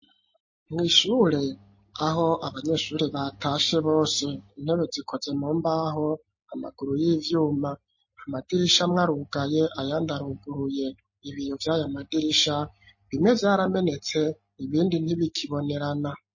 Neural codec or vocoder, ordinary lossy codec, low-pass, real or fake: none; MP3, 32 kbps; 7.2 kHz; real